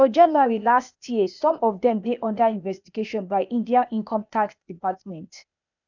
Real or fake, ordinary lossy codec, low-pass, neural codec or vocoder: fake; none; 7.2 kHz; codec, 16 kHz, 0.8 kbps, ZipCodec